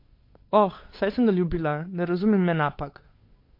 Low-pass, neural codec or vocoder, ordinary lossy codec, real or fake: 5.4 kHz; codec, 16 kHz, 8 kbps, FunCodec, trained on Chinese and English, 25 frames a second; MP3, 32 kbps; fake